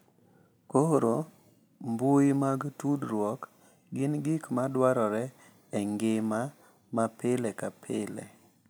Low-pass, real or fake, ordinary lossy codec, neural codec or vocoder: none; real; none; none